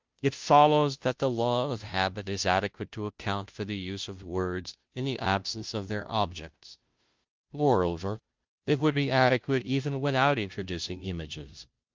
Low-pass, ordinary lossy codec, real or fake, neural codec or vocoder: 7.2 kHz; Opus, 24 kbps; fake; codec, 16 kHz, 0.5 kbps, FunCodec, trained on Chinese and English, 25 frames a second